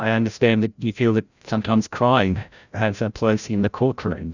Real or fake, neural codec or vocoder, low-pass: fake; codec, 16 kHz, 0.5 kbps, FreqCodec, larger model; 7.2 kHz